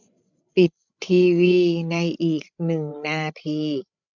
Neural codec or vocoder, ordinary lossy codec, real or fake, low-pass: codec, 16 kHz, 16 kbps, FreqCodec, larger model; none; fake; 7.2 kHz